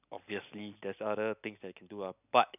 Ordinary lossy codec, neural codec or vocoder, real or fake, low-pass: none; none; real; 3.6 kHz